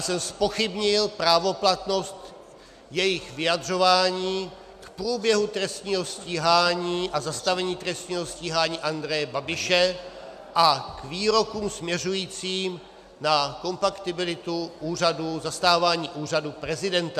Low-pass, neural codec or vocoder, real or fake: 14.4 kHz; none; real